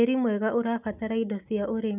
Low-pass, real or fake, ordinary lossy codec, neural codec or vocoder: 3.6 kHz; real; none; none